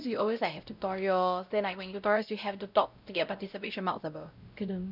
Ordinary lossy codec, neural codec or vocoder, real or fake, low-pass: none; codec, 16 kHz, 0.5 kbps, X-Codec, WavLM features, trained on Multilingual LibriSpeech; fake; 5.4 kHz